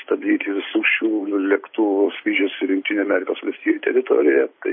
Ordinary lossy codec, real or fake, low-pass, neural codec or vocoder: MP3, 24 kbps; real; 7.2 kHz; none